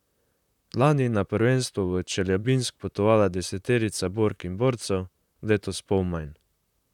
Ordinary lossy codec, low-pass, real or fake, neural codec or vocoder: none; 19.8 kHz; fake; vocoder, 44.1 kHz, 128 mel bands, Pupu-Vocoder